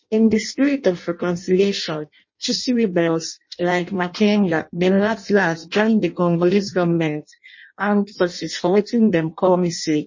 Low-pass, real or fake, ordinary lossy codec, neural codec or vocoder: 7.2 kHz; fake; MP3, 32 kbps; codec, 16 kHz in and 24 kHz out, 0.6 kbps, FireRedTTS-2 codec